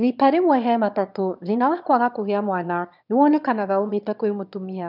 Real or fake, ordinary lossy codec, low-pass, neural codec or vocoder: fake; none; 5.4 kHz; autoencoder, 22.05 kHz, a latent of 192 numbers a frame, VITS, trained on one speaker